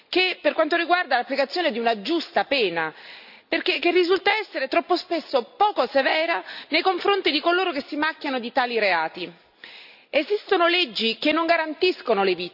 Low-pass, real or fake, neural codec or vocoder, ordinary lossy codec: 5.4 kHz; real; none; none